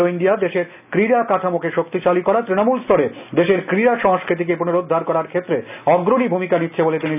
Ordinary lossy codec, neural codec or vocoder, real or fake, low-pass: none; none; real; 3.6 kHz